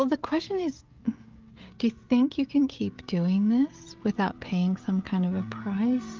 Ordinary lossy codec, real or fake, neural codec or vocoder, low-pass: Opus, 32 kbps; fake; codec, 16 kHz, 16 kbps, FreqCodec, smaller model; 7.2 kHz